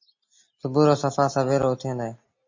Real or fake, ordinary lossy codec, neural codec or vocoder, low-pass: real; MP3, 32 kbps; none; 7.2 kHz